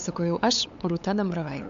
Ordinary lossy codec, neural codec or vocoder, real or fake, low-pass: MP3, 64 kbps; codec, 16 kHz, 8 kbps, FunCodec, trained on LibriTTS, 25 frames a second; fake; 7.2 kHz